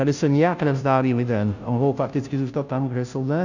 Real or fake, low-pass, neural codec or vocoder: fake; 7.2 kHz; codec, 16 kHz, 0.5 kbps, FunCodec, trained on Chinese and English, 25 frames a second